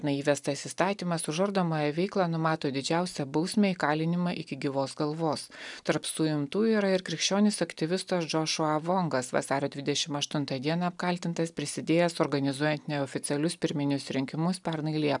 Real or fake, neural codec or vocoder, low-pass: real; none; 10.8 kHz